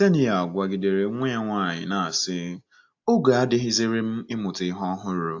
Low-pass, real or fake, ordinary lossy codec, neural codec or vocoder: 7.2 kHz; real; AAC, 48 kbps; none